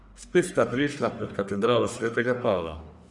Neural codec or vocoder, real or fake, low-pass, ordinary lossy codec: codec, 44.1 kHz, 1.7 kbps, Pupu-Codec; fake; 10.8 kHz; none